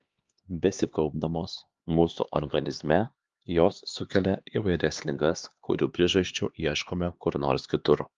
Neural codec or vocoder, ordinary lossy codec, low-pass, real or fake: codec, 16 kHz, 2 kbps, X-Codec, HuBERT features, trained on LibriSpeech; Opus, 32 kbps; 7.2 kHz; fake